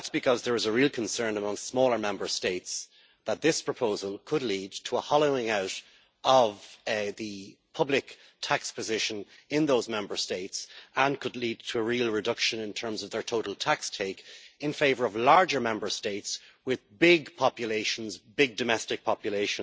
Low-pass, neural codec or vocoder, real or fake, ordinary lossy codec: none; none; real; none